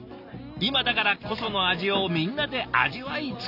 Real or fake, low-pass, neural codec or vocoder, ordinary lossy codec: real; 5.4 kHz; none; none